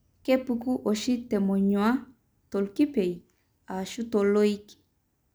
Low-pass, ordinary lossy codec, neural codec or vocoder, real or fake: none; none; none; real